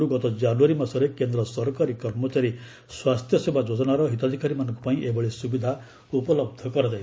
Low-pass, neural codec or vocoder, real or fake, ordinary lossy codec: none; none; real; none